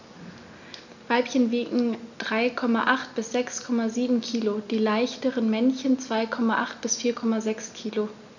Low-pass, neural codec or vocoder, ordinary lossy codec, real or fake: 7.2 kHz; none; none; real